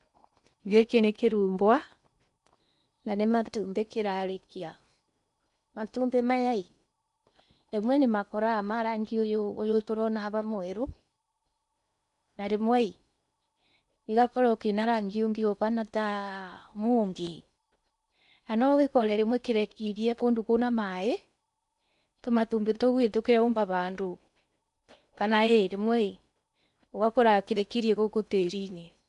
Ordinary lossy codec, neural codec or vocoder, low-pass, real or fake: none; codec, 16 kHz in and 24 kHz out, 0.8 kbps, FocalCodec, streaming, 65536 codes; 10.8 kHz; fake